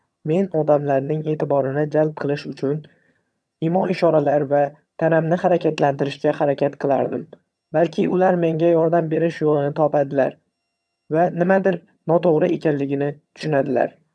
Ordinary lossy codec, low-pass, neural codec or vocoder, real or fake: none; none; vocoder, 22.05 kHz, 80 mel bands, HiFi-GAN; fake